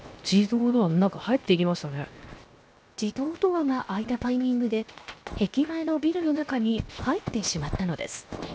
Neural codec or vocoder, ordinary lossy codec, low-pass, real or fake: codec, 16 kHz, 0.7 kbps, FocalCodec; none; none; fake